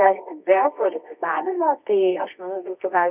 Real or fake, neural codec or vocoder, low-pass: fake; codec, 24 kHz, 0.9 kbps, WavTokenizer, medium music audio release; 3.6 kHz